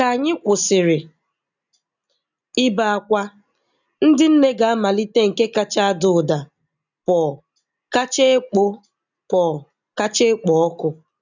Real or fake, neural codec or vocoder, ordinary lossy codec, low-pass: real; none; none; 7.2 kHz